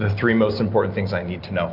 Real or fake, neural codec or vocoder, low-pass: real; none; 5.4 kHz